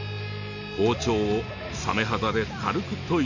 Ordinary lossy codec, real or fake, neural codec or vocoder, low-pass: none; real; none; 7.2 kHz